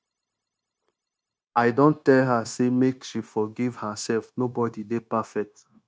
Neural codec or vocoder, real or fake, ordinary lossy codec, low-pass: codec, 16 kHz, 0.9 kbps, LongCat-Audio-Codec; fake; none; none